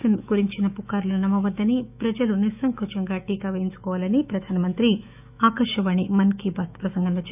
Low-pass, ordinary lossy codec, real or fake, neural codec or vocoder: 3.6 kHz; none; fake; autoencoder, 48 kHz, 128 numbers a frame, DAC-VAE, trained on Japanese speech